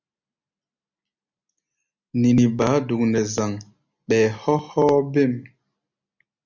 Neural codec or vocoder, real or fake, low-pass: none; real; 7.2 kHz